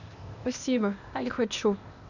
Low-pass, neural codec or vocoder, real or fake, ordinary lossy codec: 7.2 kHz; codec, 16 kHz, 0.8 kbps, ZipCodec; fake; none